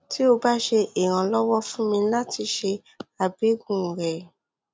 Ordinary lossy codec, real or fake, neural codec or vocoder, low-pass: none; real; none; none